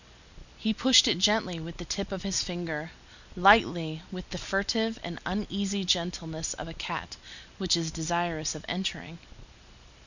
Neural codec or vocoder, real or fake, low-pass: none; real; 7.2 kHz